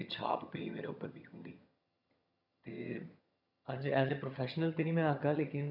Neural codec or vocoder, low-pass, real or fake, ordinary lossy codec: vocoder, 22.05 kHz, 80 mel bands, HiFi-GAN; 5.4 kHz; fake; none